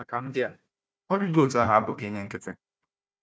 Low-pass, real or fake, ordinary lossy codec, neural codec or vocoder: none; fake; none; codec, 16 kHz, 1 kbps, FunCodec, trained on Chinese and English, 50 frames a second